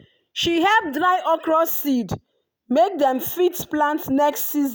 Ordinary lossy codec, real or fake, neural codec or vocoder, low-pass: none; real; none; none